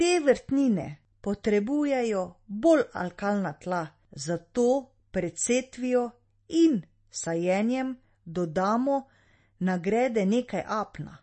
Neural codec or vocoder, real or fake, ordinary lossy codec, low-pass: none; real; MP3, 32 kbps; 10.8 kHz